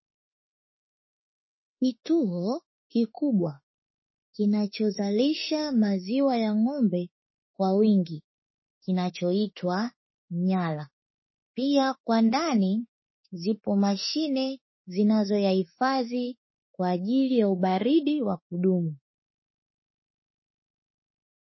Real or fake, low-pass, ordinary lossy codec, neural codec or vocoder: fake; 7.2 kHz; MP3, 24 kbps; autoencoder, 48 kHz, 32 numbers a frame, DAC-VAE, trained on Japanese speech